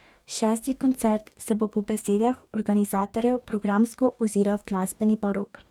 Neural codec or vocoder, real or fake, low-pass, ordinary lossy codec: codec, 44.1 kHz, 2.6 kbps, DAC; fake; 19.8 kHz; none